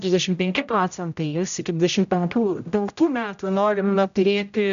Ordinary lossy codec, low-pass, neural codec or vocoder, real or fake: AAC, 96 kbps; 7.2 kHz; codec, 16 kHz, 0.5 kbps, X-Codec, HuBERT features, trained on general audio; fake